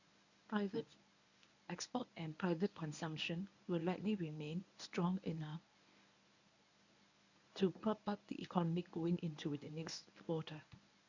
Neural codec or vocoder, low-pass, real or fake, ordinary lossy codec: codec, 24 kHz, 0.9 kbps, WavTokenizer, medium speech release version 1; 7.2 kHz; fake; none